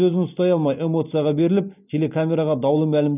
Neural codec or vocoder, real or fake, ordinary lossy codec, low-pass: none; real; none; 3.6 kHz